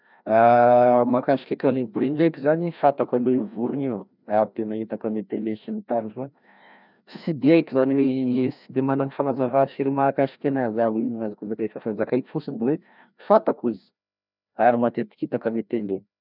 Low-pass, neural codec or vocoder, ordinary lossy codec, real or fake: 5.4 kHz; codec, 16 kHz, 1 kbps, FreqCodec, larger model; none; fake